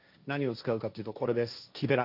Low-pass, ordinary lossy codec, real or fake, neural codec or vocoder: 5.4 kHz; none; fake; codec, 16 kHz, 1.1 kbps, Voila-Tokenizer